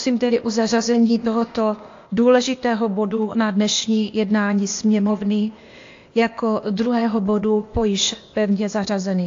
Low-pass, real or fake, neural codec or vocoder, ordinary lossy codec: 7.2 kHz; fake; codec, 16 kHz, 0.8 kbps, ZipCodec; AAC, 48 kbps